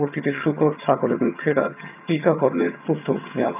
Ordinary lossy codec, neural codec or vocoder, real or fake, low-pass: none; vocoder, 22.05 kHz, 80 mel bands, HiFi-GAN; fake; 3.6 kHz